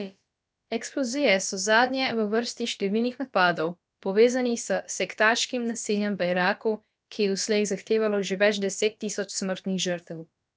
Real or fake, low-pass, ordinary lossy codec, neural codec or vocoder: fake; none; none; codec, 16 kHz, about 1 kbps, DyCAST, with the encoder's durations